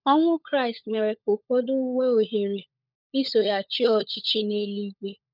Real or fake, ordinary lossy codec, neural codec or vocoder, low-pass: fake; none; codec, 16 kHz, 16 kbps, FunCodec, trained on LibriTTS, 50 frames a second; 5.4 kHz